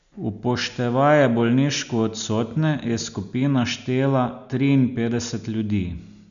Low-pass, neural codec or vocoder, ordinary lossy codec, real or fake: 7.2 kHz; none; none; real